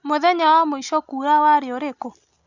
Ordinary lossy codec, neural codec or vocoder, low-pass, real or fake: Opus, 64 kbps; none; 7.2 kHz; real